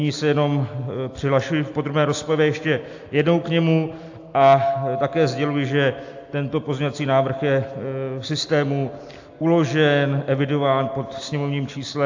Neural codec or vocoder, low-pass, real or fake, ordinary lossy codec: none; 7.2 kHz; real; AAC, 48 kbps